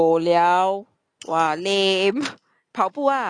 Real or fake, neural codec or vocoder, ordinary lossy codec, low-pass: real; none; none; 9.9 kHz